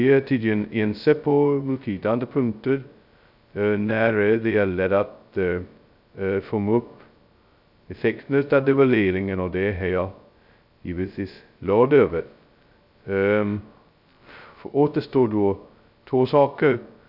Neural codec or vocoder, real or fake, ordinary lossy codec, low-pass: codec, 16 kHz, 0.2 kbps, FocalCodec; fake; none; 5.4 kHz